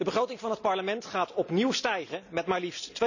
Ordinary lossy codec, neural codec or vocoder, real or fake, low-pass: none; none; real; 7.2 kHz